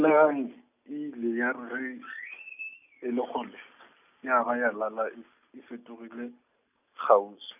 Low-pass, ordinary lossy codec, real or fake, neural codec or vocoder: 3.6 kHz; none; real; none